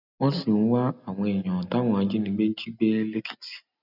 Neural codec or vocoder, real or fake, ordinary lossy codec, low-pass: none; real; none; 5.4 kHz